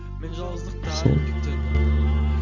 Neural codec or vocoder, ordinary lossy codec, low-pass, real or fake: none; none; 7.2 kHz; real